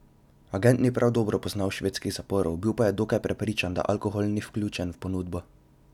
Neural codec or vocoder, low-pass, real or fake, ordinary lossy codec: none; 19.8 kHz; real; none